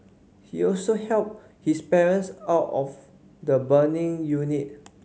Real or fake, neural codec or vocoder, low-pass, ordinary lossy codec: real; none; none; none